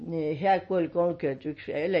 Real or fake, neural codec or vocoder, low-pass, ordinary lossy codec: real; none; 10.8 kHz; MP3, 32 kbps